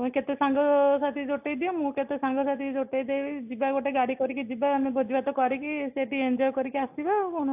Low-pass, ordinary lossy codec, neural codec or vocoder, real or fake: 3.6 kHz; AAC, 32 kbps; none; real